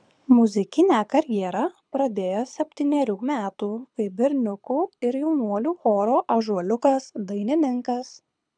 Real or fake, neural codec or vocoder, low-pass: fake; codec, 24 kHz, 6 kbps, HILCodec; 9.9 kHz